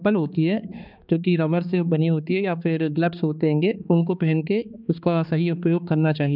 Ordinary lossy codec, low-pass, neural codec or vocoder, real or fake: none; 5.4 kHz; codec, 16 kHz, 2 kbps, X-Codec, HuBERT features, trained on balanced general audio; fake